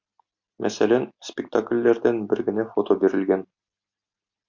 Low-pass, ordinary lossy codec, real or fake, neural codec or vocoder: 7.2 kHz; MP3, 64 kbps; real; none